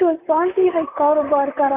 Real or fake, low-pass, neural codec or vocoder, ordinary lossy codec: fake; 3.6 kHz; vocoder, 44.1 kHz, 128 mel bands, Pupu-Vocoder; none